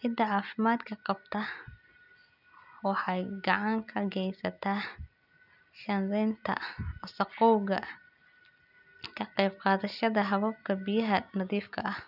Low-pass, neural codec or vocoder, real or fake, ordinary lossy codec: 5.4 kHz; none; real; none